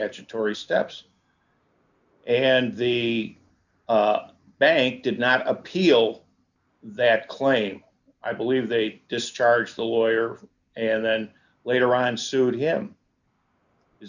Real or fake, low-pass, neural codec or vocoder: real; 7.2 kHz; none